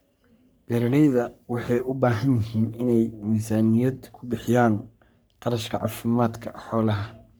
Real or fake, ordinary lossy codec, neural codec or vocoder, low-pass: fake; none; codec, 44.1 kHz, 3.4 kbps, Pupu-Codec; none